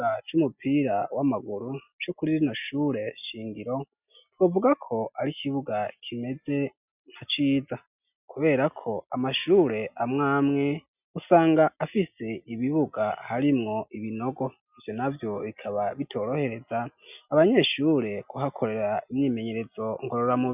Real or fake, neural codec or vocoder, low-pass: real; none; 3.6 kHz